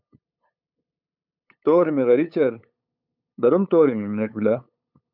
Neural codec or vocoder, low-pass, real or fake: codec, 16 kHz, 8 kbps, FunCodec, trained on LibriTTS, 25 frames a second; 5.4 kHz; fake